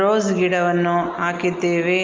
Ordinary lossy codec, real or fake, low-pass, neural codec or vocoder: Opus, 32 kbps; real; 7.2 kHz; none